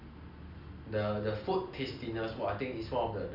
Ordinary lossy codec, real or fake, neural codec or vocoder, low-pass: none; real; none; 5.4 kHz